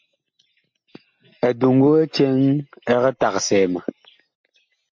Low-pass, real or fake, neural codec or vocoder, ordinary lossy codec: 7.2 kHz; real; none; MP3, 48 kbps